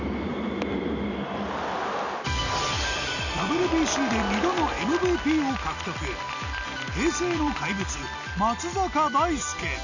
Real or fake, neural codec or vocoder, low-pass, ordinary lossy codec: real; none; 7.2 kHz; none